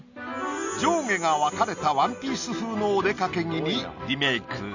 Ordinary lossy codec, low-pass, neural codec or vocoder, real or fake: none; 7.2 kHz; none; real